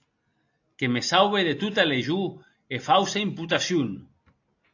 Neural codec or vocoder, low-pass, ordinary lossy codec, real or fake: none; 7.2 kHz; AAC, 48 kbps; real